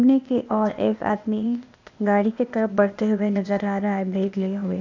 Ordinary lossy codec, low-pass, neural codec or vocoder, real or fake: AAC, 48 kbps; 7.2 kHz; codec, 16 kHz, 0.8 kbps, ZipCodec; fake